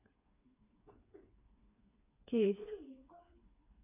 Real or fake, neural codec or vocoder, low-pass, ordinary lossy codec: fake; codec, 16 kHz, 4 kbps, FreqCodec, smaller model; 3.6 kHz; AAC, 32 kbps